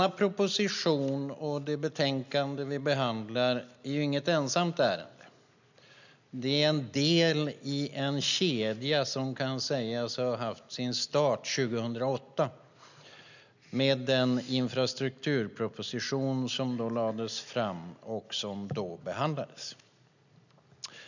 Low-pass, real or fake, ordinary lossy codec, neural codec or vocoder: 7.2 kHz; real; none; none